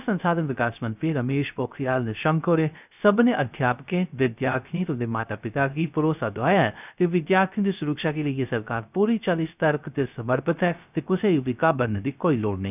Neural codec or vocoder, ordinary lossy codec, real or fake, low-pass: codec, 16 kHz, 0.3 kbps, FocalCodec; none; fake; 3.6 kHz